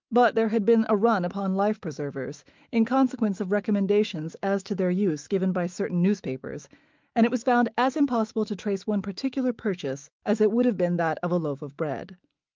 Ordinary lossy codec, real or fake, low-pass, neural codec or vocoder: Opus, 24 kbps; fake; 7.2 kHz; autoencoder, 48 kHz, 128 numbers a frame, DAC-VAE, trained on Japanese speech